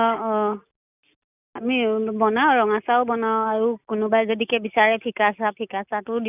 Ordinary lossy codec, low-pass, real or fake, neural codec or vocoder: none; 3.6 kHz; real; none